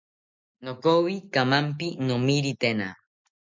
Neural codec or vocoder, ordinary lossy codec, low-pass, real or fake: none; MP3, 64 kbps; 7.2 kHz; real